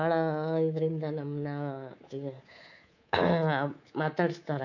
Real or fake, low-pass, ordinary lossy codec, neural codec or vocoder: fake; 7.2 kHz; none; codec, 24 kHz, 3.1 kbps, DualCodec